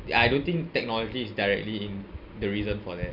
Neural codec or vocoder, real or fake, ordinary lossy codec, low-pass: none; real; none; 5.4 kHz